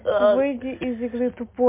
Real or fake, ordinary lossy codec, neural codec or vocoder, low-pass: real; MP3, 24 kbps; none; 3.6 kHz